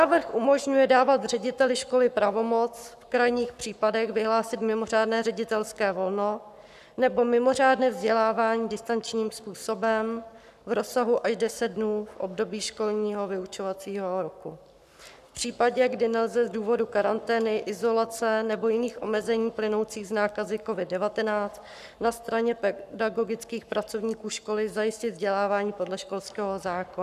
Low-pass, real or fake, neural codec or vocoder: 14.4 kHz; fake; codec, 44.1 kHz, 7.8 kbps, Pupu-Codec